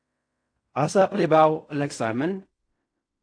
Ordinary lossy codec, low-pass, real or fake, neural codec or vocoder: AAC, 48 kbps; 9.9 kHz; fake; codec, 16 kHz in and 24 kHz out, 0.4 kbps, LongCat-Audio-Codec, fine tuned four codebook decoder